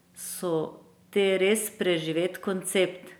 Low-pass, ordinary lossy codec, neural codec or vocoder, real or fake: none; none; none; real